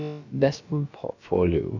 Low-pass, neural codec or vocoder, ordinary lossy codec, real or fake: 7.2 kHz; codec, 16 kHz, about 1 kbps, DyCAST, with the encoder's durations; none; fake